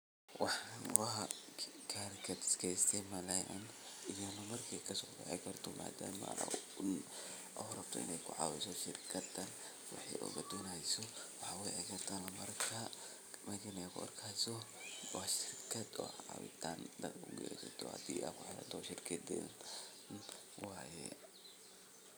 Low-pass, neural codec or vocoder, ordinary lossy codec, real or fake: none; none; none; real